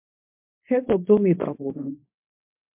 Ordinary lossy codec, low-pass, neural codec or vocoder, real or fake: MP3, 32 kbps; 3.6 kHz; codec, 24 kHz, 0.9 kbps, WavTokenizer, medium speech release version 2; fake